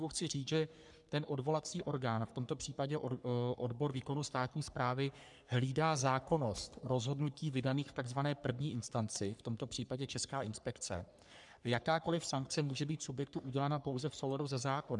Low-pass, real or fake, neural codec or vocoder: 10.8 kHz; fake; codec, 44.1 kHz, 3.4 kbps, Pupu-Codec